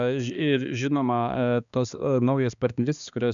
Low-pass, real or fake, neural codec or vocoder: 7.2 kHz; fake; codec, 16 kHz, 2 kbps, X-Codec, HuBERT features, trained on balanced general audio